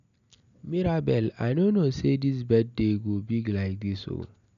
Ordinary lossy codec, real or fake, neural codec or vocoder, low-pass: none; real; none; 7.2 kHz